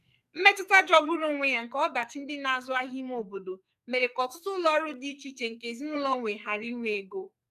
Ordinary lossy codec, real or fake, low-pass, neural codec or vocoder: none; fake; 14.4 kHz; codec, 44.1 kHz, 2.6 kbps, SNAC